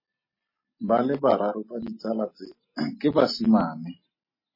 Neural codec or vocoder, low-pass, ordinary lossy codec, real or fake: none; 5.4 kHz; MP3, 24 kbps; real